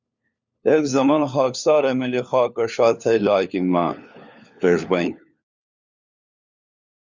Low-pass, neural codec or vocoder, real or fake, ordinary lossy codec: 7.2 kHz; codec, 16 kHz, 4 kbps, FunCodec, trained on LibriTTS, 50 frames a second; fake; Opus, 64 kbps